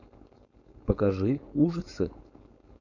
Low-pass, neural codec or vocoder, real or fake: 7.2 kHz; codec, 16 kHz, 4.8 kbps, FACodec; fake